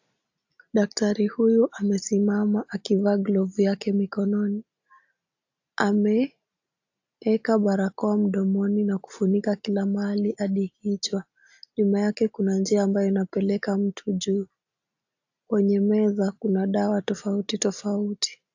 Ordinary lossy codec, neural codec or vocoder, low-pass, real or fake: AAC, 48 kbps; none; 7.2 kHz; real